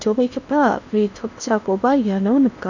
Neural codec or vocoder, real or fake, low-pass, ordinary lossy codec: codec, 16 kHz in and 24 kHz out, 0.8 kbps, FocalCodec, streaming, 65536 codes; fake; 7.2 kHz; none